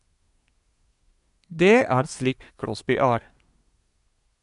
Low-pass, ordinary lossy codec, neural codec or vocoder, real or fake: 10.8 kHz; none; codec, 24 kHz, 1 kbps, SNAC; fake